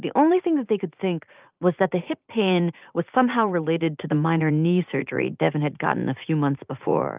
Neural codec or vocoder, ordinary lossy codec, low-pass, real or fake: vocoder, 44.1 kHz, 80 mel bands, Vocos; Opus, 24 kbps; 3.6 kHz; fake